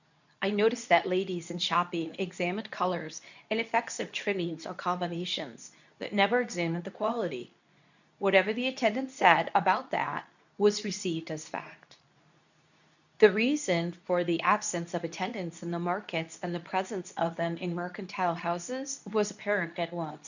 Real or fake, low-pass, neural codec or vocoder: fake; 7.2 kHz; codec, 24 kHz, 0.9 kbps, WavTokenizer, medium speech release version 2